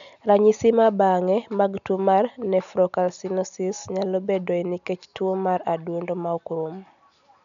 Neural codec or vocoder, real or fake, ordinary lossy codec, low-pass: none; real; none; 7.2 kHz